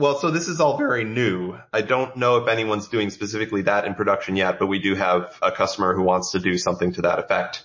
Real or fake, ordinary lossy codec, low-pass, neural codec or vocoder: real; MP3, 32 kbps; 7.2 kHz; none